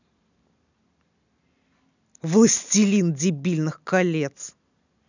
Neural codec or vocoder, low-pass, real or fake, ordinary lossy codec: none; 7.2 kHz; real; none